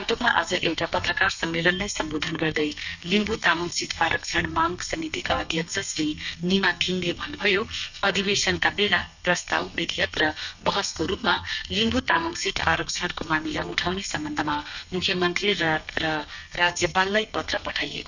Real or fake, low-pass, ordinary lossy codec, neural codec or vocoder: fake; 7.2 kHz; none; codec, 32 kHz, 1.9 kbps, SNAC